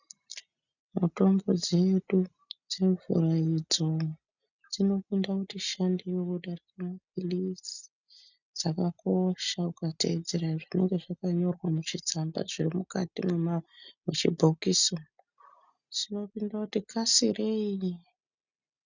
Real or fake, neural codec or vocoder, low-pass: real; none; 7.2 kHz